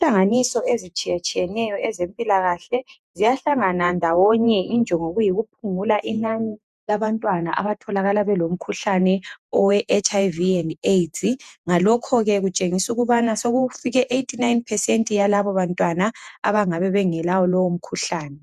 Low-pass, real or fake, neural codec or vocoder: 14.4 kHz; fake; vocoder, 48 kHz, 128 mel bands, Vocos